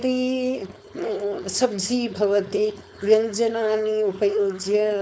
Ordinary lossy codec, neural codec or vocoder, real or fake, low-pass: none; codec, 16 kHz, 4.8 kbps, FACodec; fake; none